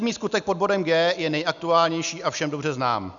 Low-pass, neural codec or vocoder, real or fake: 7.2 kHz; none; real